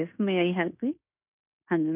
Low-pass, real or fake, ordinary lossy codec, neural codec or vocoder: 3.6 kHz; fake; none; codec, 16 kHz in and 24 kHz out, 0.9 kbps, LongCat-Audio-Codec, fine tuned four codebook decoder